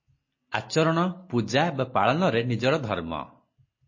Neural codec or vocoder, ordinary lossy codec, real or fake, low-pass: none; MP3, 32 kbps; real; 7.2 kHz